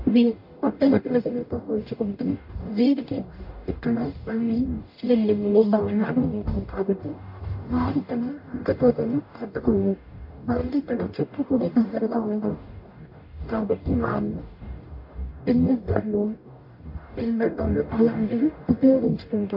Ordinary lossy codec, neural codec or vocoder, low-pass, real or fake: MP3, 32 kbps; codec, 44.1 kHz, 0.9 kbps, DAC; 5.4 kHz; fake